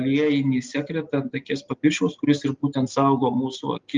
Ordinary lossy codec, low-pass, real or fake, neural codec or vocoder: Opus, 24 kbps; 7.2 kHz; real; none